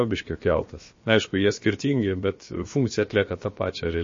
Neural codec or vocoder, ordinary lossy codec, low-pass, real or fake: codec, 16 kHz, about 1 kbps, DyCAST, with the encoder's durations; MP3, 32 kbps; 7.2 kHz; fake